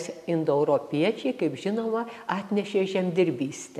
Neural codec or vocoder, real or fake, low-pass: none; real; 14.4 kHz